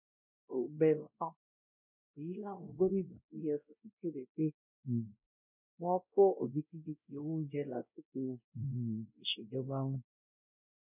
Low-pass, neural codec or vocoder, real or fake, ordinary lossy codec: 3.6 kHz; codec, 16 kHz, 0.5 kbps, X-Codec, WavLM features, trained on Multilingual LibriSpeech; fake; none